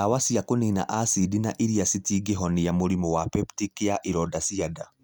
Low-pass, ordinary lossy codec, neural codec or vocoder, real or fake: none; none; none; real